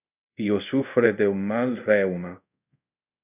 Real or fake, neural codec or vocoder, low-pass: fake; codec, 24 kHz, 0.5 kbps, DualCodec; 3.6 kHz